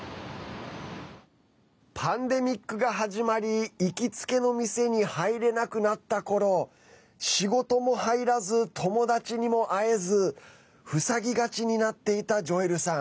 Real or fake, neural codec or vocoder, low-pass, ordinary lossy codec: real; none; none; none